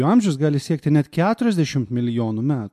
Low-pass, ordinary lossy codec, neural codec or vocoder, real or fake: 14.4 kHz; MP3, 64 kbps; none; real